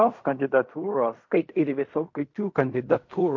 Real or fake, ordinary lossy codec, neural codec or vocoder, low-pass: fake; MP3, 48 kbps; codec, 16 kHz in and 24 kHz out, 0.4 kbps, LongCat-Audio-Codec, fine tuned four codebook decoder; 7.2 kHz